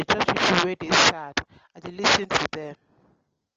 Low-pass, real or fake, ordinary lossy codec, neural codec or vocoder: 7.2 kHz; real; Opus, 24 kbps; none